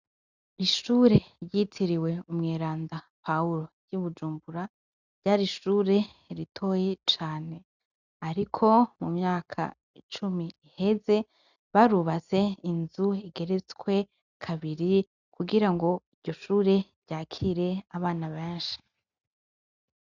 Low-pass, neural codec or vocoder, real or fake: 7.2 kHz; none; real